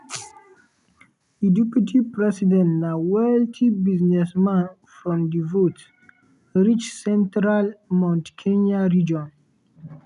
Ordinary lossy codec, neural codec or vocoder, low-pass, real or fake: none; none; 10.8 kHz; real